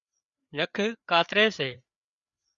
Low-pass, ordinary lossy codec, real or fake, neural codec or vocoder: 7.2 kHz; Opus, 64 kbps; fake; codec, 16 kHz, 4 kbps, FreqCodec, larger model